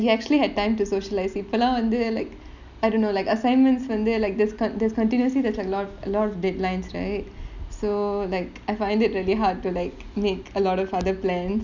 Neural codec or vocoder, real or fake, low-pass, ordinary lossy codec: none; real; 7.2 kHz; none